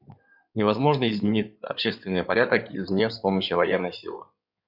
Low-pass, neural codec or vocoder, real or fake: 5.4 kHz; codec, 16 kHz in and 24 kHz out, 2.2 kbps, FireRedTTS-2 codec; fake